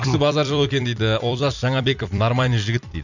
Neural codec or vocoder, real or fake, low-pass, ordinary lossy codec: vocoder, 44.1 kHz, 128 mel bands every 256 samples, BigVGAN v2; fake; 7.2 kHz; none